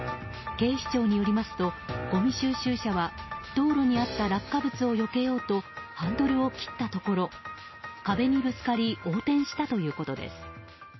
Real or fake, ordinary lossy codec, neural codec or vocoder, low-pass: real; MP3, 24 kbps; none; 7.2 kHz